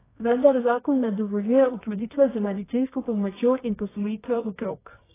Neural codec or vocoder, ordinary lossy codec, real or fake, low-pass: codec, 24 kHz, 0.9 kbps, WavTokenizer, medium music audio release; AAC, 16 kbps; fake; 3.6 kHz